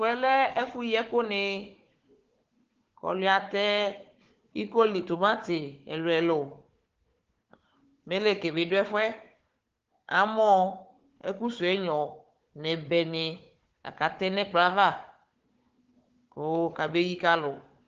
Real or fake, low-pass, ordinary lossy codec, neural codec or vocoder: fake; 7.2 kHz; Opus, 16 kbps; codec, 16 kHz, 4 kbps, FunCodec, trained on Chinese and English, 50 frames a second